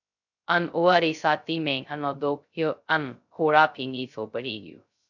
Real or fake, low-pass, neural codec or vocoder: fake; 7.2 kHz; codec, 16 kHz, 0.2 kbps, FocalCodec